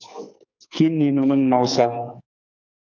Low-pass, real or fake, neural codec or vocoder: 7.2 kHz; fake; codec, 44.1 kHz, 2.6 kbps, SNAC